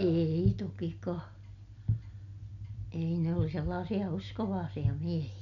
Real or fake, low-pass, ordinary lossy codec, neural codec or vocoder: real; 7.2 kHz; none; none